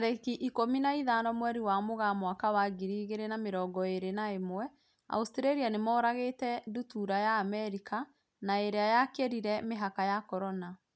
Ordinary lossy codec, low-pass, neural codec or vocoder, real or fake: none; none; none; real